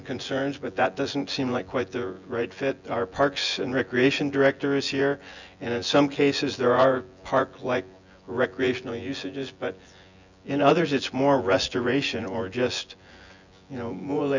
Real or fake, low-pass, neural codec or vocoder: fake; 7.2 kHz; vocoder, 24 kHz, 100 mel bands, Vocos